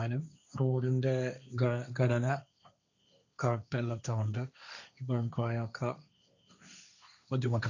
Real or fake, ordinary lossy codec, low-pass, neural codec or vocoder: fake; none; 7.2 kHz; codec, 16 kHz, 1.1 kbps, Voila-Tokenizer